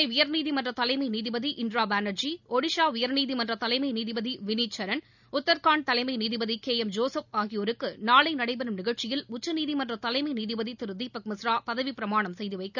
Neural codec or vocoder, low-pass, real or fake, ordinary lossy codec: none; 7.2 kHz; real; none